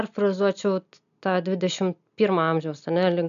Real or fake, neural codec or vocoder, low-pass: real; none; 7.2 kHz